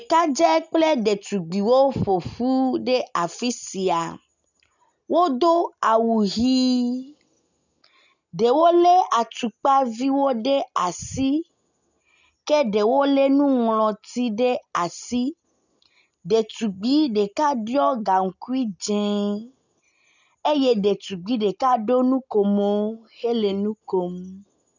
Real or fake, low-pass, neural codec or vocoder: real; 7.2 kHz; none